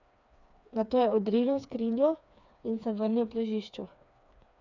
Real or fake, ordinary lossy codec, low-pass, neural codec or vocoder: fake; none; 7.2 kHz; codec, 16 kHz, 4 kbps, FreqCodec, smaller model